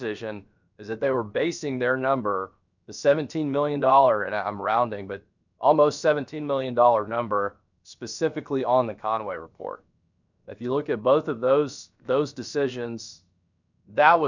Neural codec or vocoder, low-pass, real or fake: codec, 16 kHz, about 1 kbps, DyCAST, with the encoder's durations; 7.2 kHz; fake